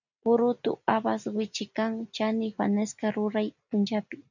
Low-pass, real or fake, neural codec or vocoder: 7.2 kHz; real; none